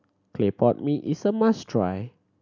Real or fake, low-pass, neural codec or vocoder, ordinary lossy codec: real; 7.2 kHz; none; none